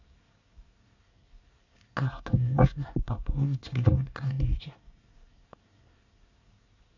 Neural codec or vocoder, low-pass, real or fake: codec, 24 kHz, 1 kbps, SNAC; 7.2 kHz; fake